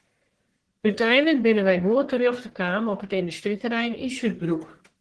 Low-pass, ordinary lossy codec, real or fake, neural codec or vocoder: 10.8 kHz; Opus, 16 kbps; fake; codec, 44.1 kHz, 1.7 kbps, Pupu-Codec